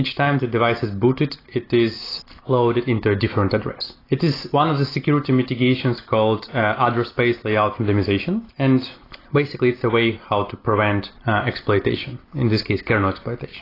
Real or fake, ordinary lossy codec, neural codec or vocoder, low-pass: real; AAC, 24 kbps; none; 5.4 kHz